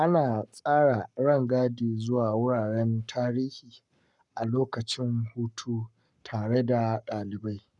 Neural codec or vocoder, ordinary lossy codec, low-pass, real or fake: codec, 44.1 kHz, 7.8 kbps, Pupu-Codec; none; 10.8 kHz; fake